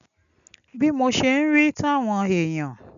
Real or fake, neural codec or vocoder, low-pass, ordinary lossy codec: real; none; 7.2 kHz; MP3, 96 kbps